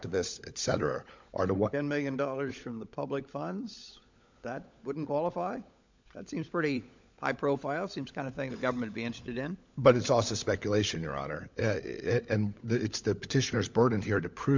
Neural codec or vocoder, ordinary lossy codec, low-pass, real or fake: codec, 16 kHz, 16 kbps, FunCodec, trained on LibriTTS, 50 frames a second; MP3, 64 kbps; 7.2 kHz; fake